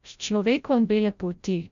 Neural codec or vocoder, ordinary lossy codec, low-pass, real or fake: codec, 16 kHz, 0.5 kbps, FreqCodec, larger model; none; 7.2 kHz; fake